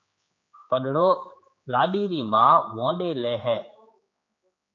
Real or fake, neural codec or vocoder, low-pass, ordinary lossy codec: fake; codec, 16 kHz, 4 kbps, X-Codec, HuBERT features, trained on general audio; 7.2 kHz; AAC, 48 kbps